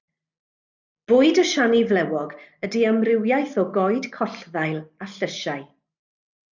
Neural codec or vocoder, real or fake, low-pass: none; real; 7.2 kHz